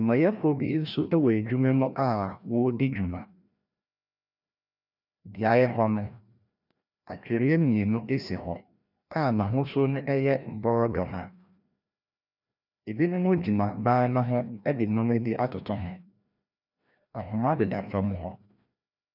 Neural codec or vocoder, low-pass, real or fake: codec, 16 kHz, 1 kbps, FreqCodec, larger model; 5.4 kHz; fake